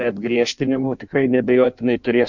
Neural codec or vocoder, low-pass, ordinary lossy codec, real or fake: codec, 16 kHz in and 24 kHz out, 1.1 kbps, FireRedTTS-2 codec; 7.2 kHz; MP3, 64 kbps; fake